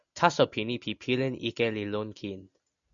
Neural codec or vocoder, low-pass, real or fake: none; 7.2 kHz; real